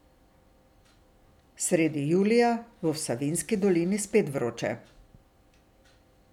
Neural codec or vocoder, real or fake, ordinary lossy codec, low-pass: none; real; none; 19.8 kHz